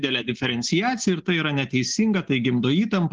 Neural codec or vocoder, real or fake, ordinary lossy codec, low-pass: none; real; Opus, 16 kbps; 7.2 kHz